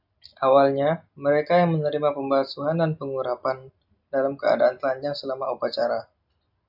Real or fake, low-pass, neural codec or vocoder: real; 5.4 kHz; none